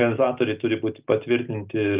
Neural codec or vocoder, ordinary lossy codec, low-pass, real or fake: none; Opus, 24 kbps; 3.6 kHz; real